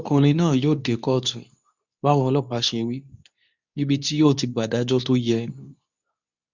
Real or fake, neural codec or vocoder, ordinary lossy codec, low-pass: fake; codec, 24 kHz, 0.9 kbps, WavTokenizer, medium speech release version 2; none; 7.2 kHz